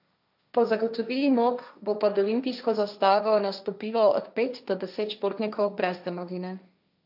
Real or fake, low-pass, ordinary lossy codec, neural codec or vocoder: fake; 5.4 kHz; none; codec, 16 kHz, 1.1 kbps, Voila-Tokenizer